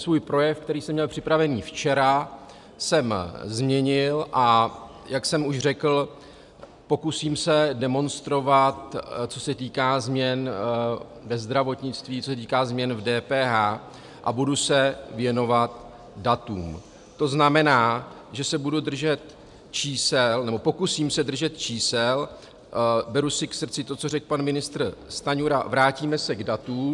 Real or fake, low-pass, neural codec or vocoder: real; 10.8 kHz; none